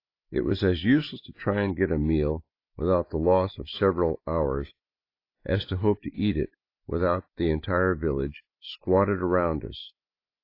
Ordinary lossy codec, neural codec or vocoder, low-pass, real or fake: AAC, 32 kbps; none; 5.4 kHz; real